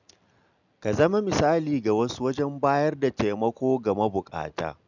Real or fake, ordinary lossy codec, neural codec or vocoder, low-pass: real; none; none; 7.2 kHz